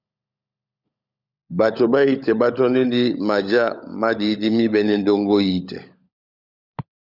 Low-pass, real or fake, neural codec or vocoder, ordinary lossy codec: 5.4 kHz; fake; codec, 16 kHz, 16 kbps, FunCodec, trained on LibriTTS, 50 frames a second; Opus, 64 kbps